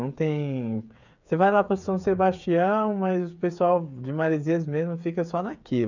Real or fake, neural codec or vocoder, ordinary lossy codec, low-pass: fake; codec, 16 kHz, 8 kbps, FreqCodec, smaller model; none; 7.2 kHz